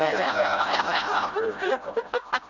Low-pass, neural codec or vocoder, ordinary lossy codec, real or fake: 7.2 kHz; codec, 16 kHz, 0.5 kbps, FreqCodec, smaller model; none; fake